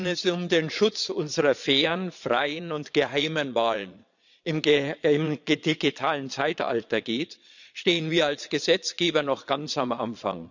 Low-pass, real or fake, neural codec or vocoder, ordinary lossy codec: 7.2 kHz; fake; vocoder, 22.05 kHz, 80 mel bands, Vocos; none